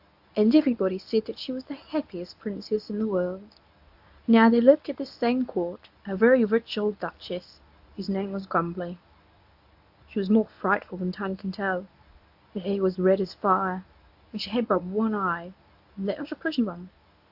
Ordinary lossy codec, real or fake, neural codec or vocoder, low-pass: AAC, 48 kbps; fake; codec, 24 kHz, 0.9 kbps, WavTokenizer, medium speech release version 1; 5.4 kHz